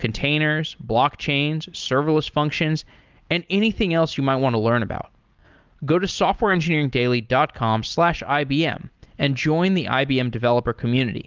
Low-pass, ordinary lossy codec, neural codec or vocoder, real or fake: 7.2 kHz; Opus, 24 kbps; none; real